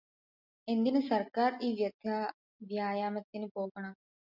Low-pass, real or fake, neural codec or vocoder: 5.4 kHz; real; none